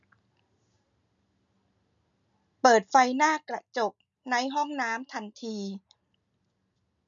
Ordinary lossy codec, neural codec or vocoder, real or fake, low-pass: none; none; real; 7.2 kHz